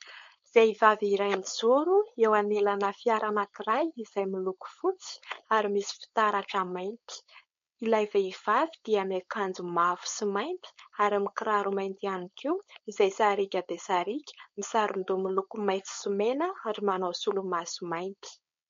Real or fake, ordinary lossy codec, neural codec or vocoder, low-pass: fake; MP3, 48 kbps; codec, 16 kHz, 4.8 kbps, FACodec; 7.2 kHz